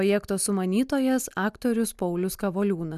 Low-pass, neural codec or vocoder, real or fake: 14.4 kHz; none; real